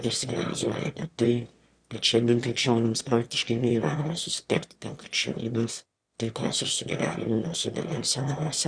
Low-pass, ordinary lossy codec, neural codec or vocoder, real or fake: 9.9 kHz; Opus, 64 kbps; autoencoder, 22.05 kHz, a latent of 192 numbers a frame, VITS, trained on one speaker; fake